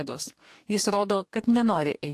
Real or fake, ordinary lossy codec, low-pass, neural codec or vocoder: fake; AAC, 64 kbps; 14.4 kHz; codec, 44.1 kHz, 2.6 kbps, DAC